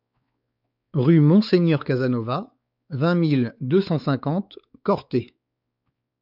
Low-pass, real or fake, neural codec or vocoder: 5.4 kHz; fake; codec, 16 kHz, 4 kbps, X-Codec, WavLM features, trained on Multilingual LibriSpeech